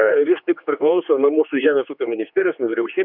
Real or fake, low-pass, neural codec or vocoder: fake; 5.4 kHz; codec, 16 kHz, 2 kbps, X-Codec, HuBERT features, trained on general audio